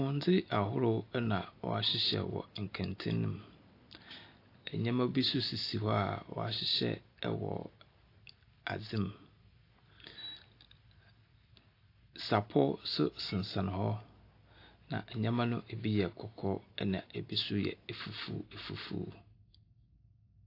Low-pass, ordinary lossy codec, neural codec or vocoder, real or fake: 5.4 kHz; AAC, 32 kbps; none; real